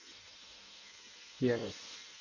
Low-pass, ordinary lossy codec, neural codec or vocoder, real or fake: 7.2 kHz; none; codec, 16 kHz in and 24 kHz out, 0.6 kbps, FireRedTTS-2 codec; fake